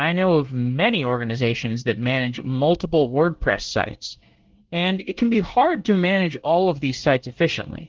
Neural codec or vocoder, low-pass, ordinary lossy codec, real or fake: codec, 24 kHz, 1 kbps, SNAC; 7.2 kHz; Opus, 16 kbps; fake